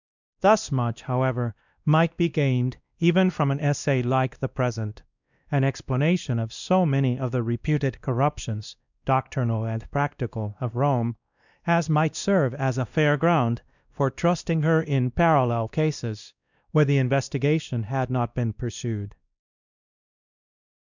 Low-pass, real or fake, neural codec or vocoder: 7.2 kHz; fake; codec, 16 kHz, 1 kbps, X-Codec, WavLM features, trained on Multilingual LibriSpeech